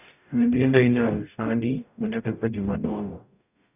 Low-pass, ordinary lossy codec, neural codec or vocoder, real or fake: 3.6 kHz; none; codec, 44.1 kHz, 0.9 kbps, DAC; fake